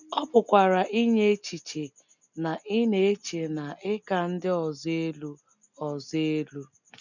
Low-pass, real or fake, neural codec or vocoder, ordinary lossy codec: 7.2 kHz; real; none; none